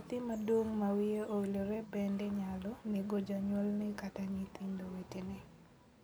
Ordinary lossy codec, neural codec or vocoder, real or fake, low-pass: none; none; real; none